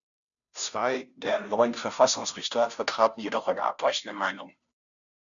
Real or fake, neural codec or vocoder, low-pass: fake; codec, 16 kHz, 0.5 kbps, FunCodec, trained on Chinese and English, 25 frames a second; 7.2 kHz